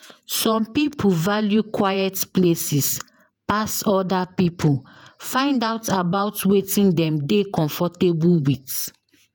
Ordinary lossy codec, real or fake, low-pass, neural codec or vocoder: none; fake; none; vocoder, 48 kHz, 128 mel bands, Vocos